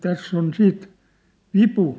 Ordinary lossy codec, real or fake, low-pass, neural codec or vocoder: none; real; none; none